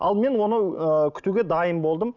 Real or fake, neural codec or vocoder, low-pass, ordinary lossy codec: real; none; 7.2 kHz; none